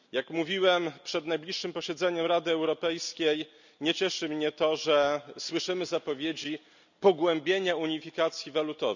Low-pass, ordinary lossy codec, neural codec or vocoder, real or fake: 7.2 kHz; none; none; real